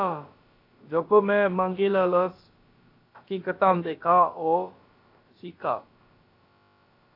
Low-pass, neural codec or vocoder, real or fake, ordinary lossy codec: 5.4 kHz; codec, 16 kHz, about 1 kbps, DyCAST, with the encoder's durations; fake; AAC, 32 kbps